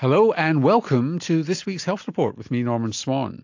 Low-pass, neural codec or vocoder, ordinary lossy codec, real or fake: 7.2 kHz; none; AAC, 48 kbps; real